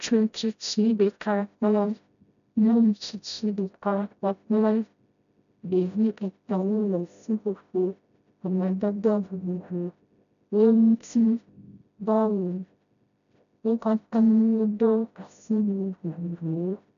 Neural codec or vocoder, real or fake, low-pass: codec, 16 kHz, 0.5 kbps, FreqCodec, smaller model; fake; 7.2 kHz